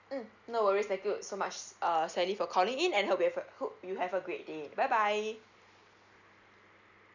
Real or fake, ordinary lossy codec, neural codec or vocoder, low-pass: real; none; none; 7.2 kHz